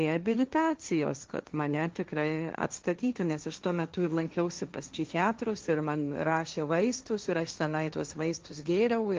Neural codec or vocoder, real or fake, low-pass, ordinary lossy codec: codec, 16 kHz, 1.1 kbps, Voila-Tokenizer; fake; 7.2 kHz; Opus, 32 kbps